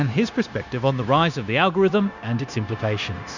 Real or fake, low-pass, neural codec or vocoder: fake; 7.2 kHz; codec, 16 kHz, 0.9 kbps, LongCat-Audio-Codec